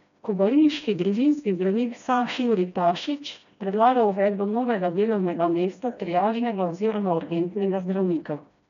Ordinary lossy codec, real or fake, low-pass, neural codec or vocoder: none; fake; 7.2 kHz; codec, 16 kHz, 1 kbps, FreqCodec, smaller model